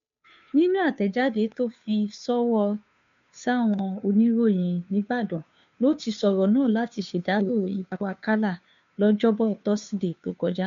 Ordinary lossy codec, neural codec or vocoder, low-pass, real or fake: MP3, 64 kbps; codec, 16 kHz, 2 kbps, FunCodec, trained on Chinese and English, 25 frames a second; 7.2 kHz; fake